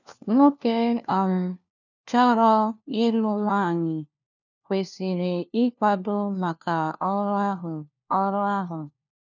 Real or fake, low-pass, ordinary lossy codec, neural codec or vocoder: fake; 7.2 kHz; none; codec, 16 kHz, 1 kbps, FunCodec, trained on LibriTTS, 50 frames a second